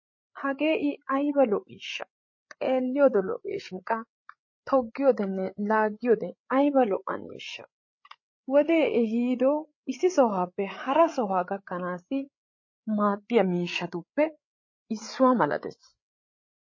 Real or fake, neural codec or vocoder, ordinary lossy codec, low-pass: fake; codec, 16 kHz, 8 kbps, FreqCodec, larger model; MP3, 32 kbps; 7.2 kHz